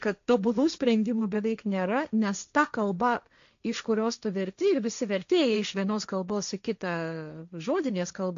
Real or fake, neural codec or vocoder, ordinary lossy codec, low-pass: fake; codec, 16 kHz, 1.1 kbps, Voila-Tokenizer; MP3, 64 kbps; 7.2 kHz